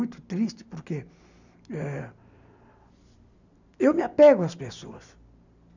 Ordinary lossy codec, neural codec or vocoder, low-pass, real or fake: none; none; 7.2 kHz; real